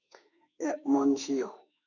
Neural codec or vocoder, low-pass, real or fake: autoencoder, 48 kHz, 32 numbers a frame, DAC-VAE, trained on Japanese speech; 7.2 kHz; fake